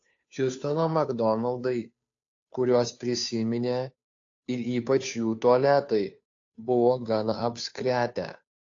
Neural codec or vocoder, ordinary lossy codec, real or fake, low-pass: codec, 16 kHz, 2 kbps, FunCodec, trained on Chinese and English, 25 frames a second; AAC, 48 kbps; fake; 7.2 kHz